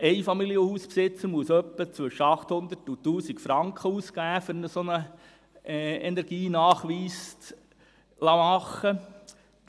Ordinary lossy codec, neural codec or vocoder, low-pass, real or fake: none; none; none; real